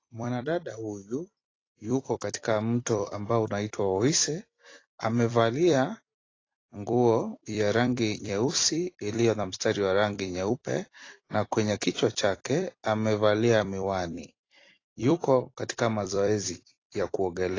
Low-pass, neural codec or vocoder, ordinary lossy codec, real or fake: 7.2 kHz; vocoder, 44.1 kHz, 128 mel bands every 256 samples, BigVGAN v2; AAC, 32 kbps; fake